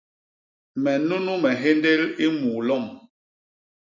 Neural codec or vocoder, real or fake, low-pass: none; real; 7.2 kHz